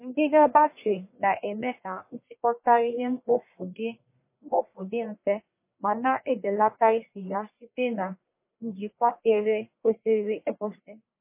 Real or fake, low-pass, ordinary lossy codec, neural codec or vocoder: fake; 3.6 kHz; MP3, 24 kbps; codec, 44.1 kHz, 1.7 kbps, Pupu-Codec